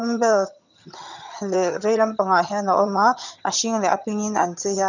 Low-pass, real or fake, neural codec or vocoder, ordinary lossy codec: 7.2 kHz; fake; vocoder, 22.05 kHz, 80 mel bands, HiFi-GAN; none